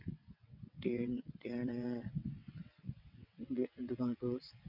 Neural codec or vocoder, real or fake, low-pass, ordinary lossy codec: vocoder, 22.05 kHz, 80 mel bands, WaveNeXt; fake; 5.4 kHz; none